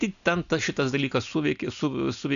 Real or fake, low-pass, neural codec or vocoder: real; 7.2 kHz; none